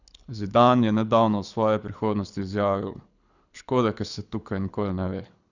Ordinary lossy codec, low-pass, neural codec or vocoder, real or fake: none; 7.2 kHz; codec, 24 kHz, 6 kbps, HILCodec; fake